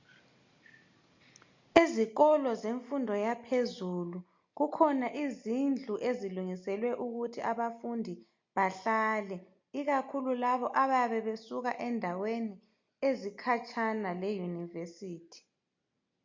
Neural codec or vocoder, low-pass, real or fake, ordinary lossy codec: none; 7.2 kHz; real; MP3, 48 kbps